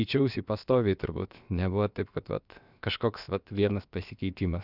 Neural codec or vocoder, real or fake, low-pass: codec, 16 kHz, about 1 kbps, DyCAST, with the encoder's durations; fake; 5.4 kHz